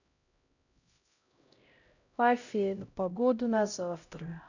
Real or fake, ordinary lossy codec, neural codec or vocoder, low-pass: fake; none; codec, 16 kHz, 0.5 kbps, X-Codec, HuBERT features, trained on LibriSpeech; 7.2 kHz